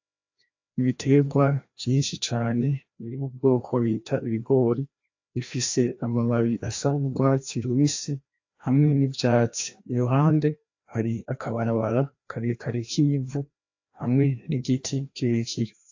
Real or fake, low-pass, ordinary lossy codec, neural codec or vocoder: fake; 7.2 kHz; AAC, 48 kbps; codec, 16 kHz, 1 kbps, FreqCodec, larger model